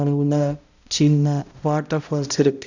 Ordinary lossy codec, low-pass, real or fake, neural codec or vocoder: none; 7.2 kHz; fake; codec, 16 kHz, 0.5 kbps, X-Codec, HuBERT features, trained on balanced general audio